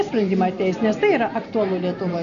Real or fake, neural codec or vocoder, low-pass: real; none; 7.2 kHz